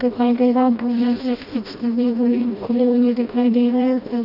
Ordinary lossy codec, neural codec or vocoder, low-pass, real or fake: none; codec, 16 kHz, 1 kbps, FreqCodec, smaller model; 5.4 kHz; fake